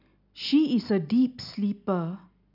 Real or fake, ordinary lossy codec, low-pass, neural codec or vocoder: real; none; 5.4 kHz; none